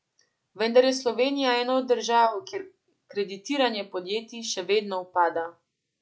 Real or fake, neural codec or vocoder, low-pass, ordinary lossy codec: real; none; none; none